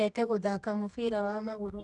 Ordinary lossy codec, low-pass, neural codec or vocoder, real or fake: none; 10.8 kHz; codec, 24 kHz, 0.9 kbps, WavTokenizer, medium music audio release; fake